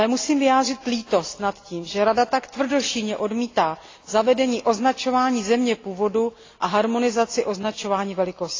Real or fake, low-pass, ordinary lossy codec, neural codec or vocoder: real; 7.2 kHz; AAC, 32 kbps; none